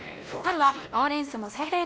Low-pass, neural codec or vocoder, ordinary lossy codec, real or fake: none; codec, 16 kHz, 1 kbps, X-Codec, WavLM features, trained on Multilingual LibriSpeech; none; fake